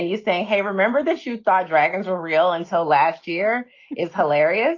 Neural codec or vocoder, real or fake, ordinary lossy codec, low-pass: none; real; Opus, 32 kbps; 7.2 kHz